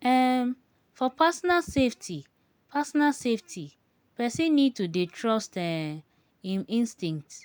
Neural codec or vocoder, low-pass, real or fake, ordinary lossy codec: none; none; real; none